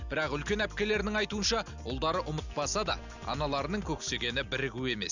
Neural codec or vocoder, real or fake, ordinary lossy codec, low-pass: none; real; none; 7.2 kHz